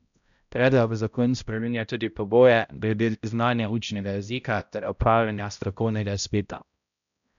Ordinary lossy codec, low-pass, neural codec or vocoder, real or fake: none; 7.2 kHz; codec, 16 kHz, 0.5 kbps, X-Codec, HuBERT features, trained on balanced general audio; fake